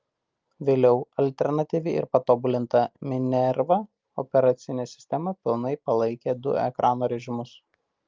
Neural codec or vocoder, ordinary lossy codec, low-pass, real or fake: none; Opus, 32 kbps; 7.2 kHz; real